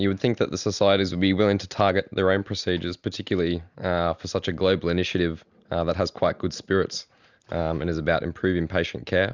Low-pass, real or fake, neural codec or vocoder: 7.2 kHz; real; none